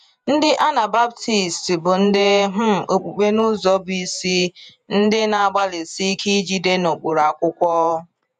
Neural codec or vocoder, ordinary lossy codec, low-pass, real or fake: vocoder, 48 kHz, 128 mel bands, Vocos; none; 14.4 kHz; fake